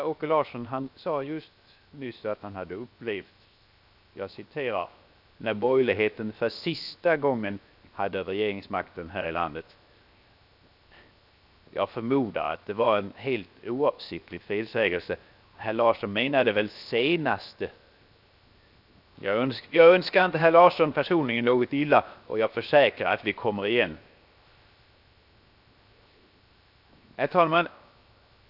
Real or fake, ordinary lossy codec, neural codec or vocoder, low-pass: fake; none; codec, 16 kHz, 0.7 kbps, FocalCodec; 5.4 kHz